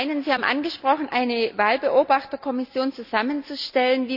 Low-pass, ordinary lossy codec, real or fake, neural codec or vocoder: 5.4 kHz; none; real; none